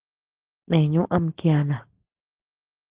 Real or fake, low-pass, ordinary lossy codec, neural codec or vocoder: real; 3.6 kHz; Opus, 16 kbps; none